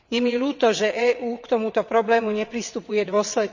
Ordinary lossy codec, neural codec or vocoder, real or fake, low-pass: none; vocoder, 22.05 kHz, 80 mel bands, WaveNeXt; fake; 7.2 kHz